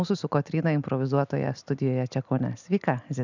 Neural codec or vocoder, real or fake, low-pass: none; real; 7.2 kHz